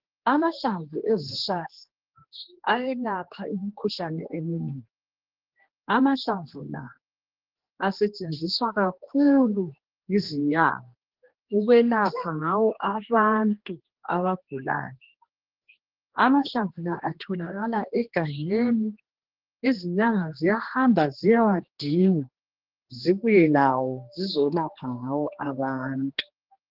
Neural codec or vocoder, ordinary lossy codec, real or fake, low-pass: codec, 16 kHz, 2 kbps, X-Codec, HuBERT features, trained on general audio; Opus, 16 kbps; fake; 5.4 kHz